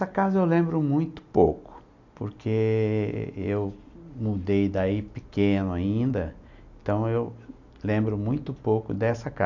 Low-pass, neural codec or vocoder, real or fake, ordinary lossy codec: 7.2 kHz; none; real; none